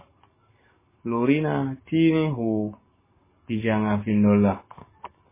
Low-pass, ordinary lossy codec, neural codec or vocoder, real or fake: 3.6 kHz; MP3, 16 kbps; codec, 44.1 kHz, 7.8 kbps, Pupu-Codec; fake